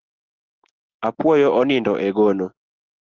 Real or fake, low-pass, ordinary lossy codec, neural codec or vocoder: real; 7.2 kHz; Opus, 16 kbps; none